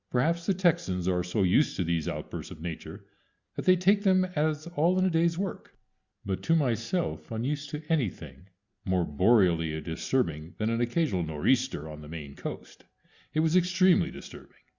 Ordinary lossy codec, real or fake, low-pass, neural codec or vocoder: Opus, 64 kbps; real; 7.2 kHz; none